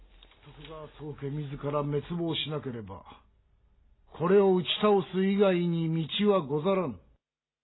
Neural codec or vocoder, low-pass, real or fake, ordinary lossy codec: none; 7.2 kHz; real; AAC, 16 kbps